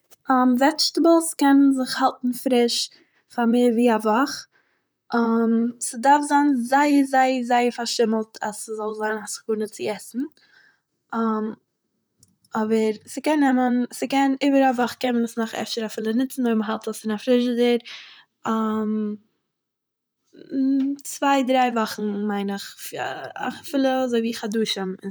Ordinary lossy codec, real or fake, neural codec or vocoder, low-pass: none; fake; vocoder, 44.1 kHz, 128 mel bands, Pupu-Vocoder; none